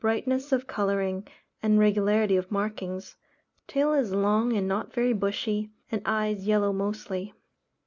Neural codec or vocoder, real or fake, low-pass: none; real; 7.2 kHz